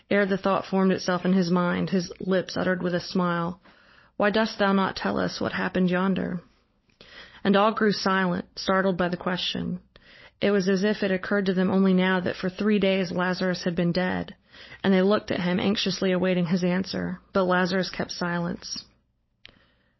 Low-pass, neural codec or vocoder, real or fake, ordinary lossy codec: 7.2 kHz; codec, 16 kHz, 16 kbps, FunCodec, trained on LibriTTS, 50 frames a second; fake; MP3, 24 kbps